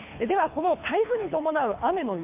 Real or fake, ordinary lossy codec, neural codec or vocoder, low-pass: fake; MP3, 32 kbps; codec, 24 kHz, 3 kbps, HILCodec; 3.6 kHz